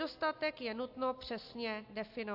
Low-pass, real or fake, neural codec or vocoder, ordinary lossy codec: 5.4 kHz; real; none; AAC, 48 kbps